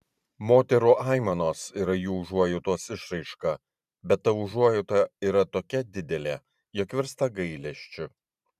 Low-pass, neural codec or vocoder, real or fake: 14.4 kHz; vocoder, 44.1 kHz, 128 mel bands every 512 samples, BigVGAN v2; fake